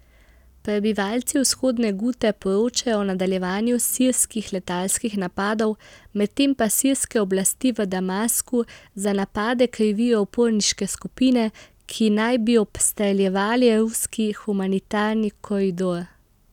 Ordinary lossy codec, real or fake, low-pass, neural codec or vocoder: none; real; 19.8 kHz; none